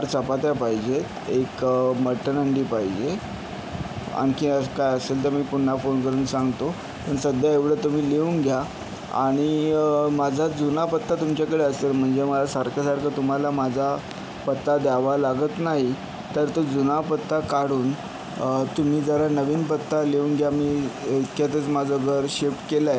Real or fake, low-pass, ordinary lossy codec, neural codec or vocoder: real; none; none; none